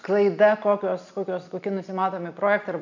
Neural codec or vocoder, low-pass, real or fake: none; 7.2 kHz; real